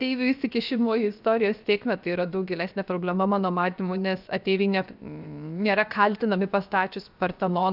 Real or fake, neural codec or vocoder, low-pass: fake; codec, 16 kHz, 0.7 kbps, FocalCodec; 5.4 kHz